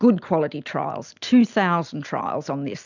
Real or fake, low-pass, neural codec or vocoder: real; 7.2 kHz; none